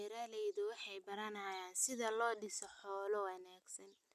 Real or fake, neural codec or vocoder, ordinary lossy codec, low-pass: real; none; none; 14.4 kHz